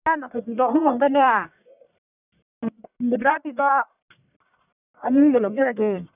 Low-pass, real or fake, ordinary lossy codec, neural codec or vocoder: 3.6 kHz; fake; none; codec, 44.1 kHz, 1.7 kbps, Pupu-Codec